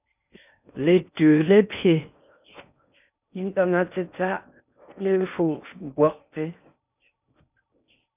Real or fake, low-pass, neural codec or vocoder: fake; 3.6 kHz; codec, 16 kHz in and 24 kHz out, 0.6 kbps, FocalCodec, streaming, 4096 codes